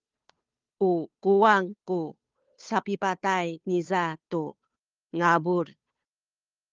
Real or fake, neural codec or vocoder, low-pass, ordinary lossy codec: fake; codec, 16 kHz, 2 kbps, FunCodec, trained on Chinese and English, 25 frames a second; 7.2 kHz; Opus, 32 kbps